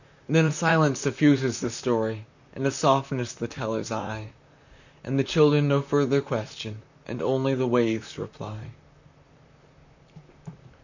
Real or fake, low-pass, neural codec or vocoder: fake; 7.2 kHz; vocoder, 44.1 kHz, 128 mel bands, Pupu-Vocoder